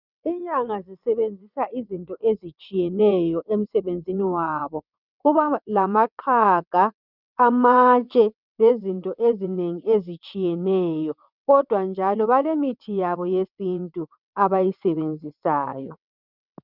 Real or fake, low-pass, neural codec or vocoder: fake; 5.4 kHz; vocoder, 22.05 kHz, 80 mel bands, WaveNeXt